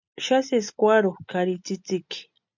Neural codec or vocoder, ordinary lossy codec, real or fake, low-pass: none; MP3, 64 kbps; real; 7.2 kHz